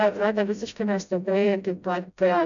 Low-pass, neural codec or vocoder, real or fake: 7.2 kHz; codec, 16 kHz, 0.5 kbps, FreqCodec, smaller model; fake